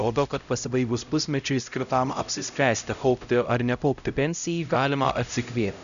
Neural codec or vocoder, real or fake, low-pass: codec, 16 kHz, 0.5 kbps, X-Codec, HuBERT features, trained on LibriSpeech; fake; 7.2 kHz